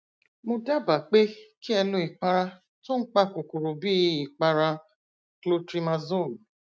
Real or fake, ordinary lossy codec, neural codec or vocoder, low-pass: real; none; none; none